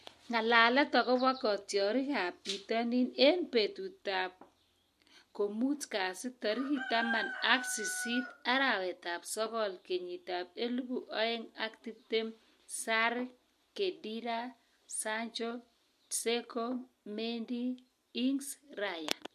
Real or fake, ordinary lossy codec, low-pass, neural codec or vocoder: real; MP3, 64 kbps; 14.4 kHz; none